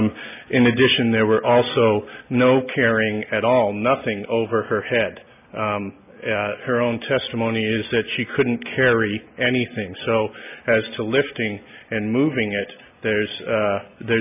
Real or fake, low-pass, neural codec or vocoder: real; 3.6 kHz; none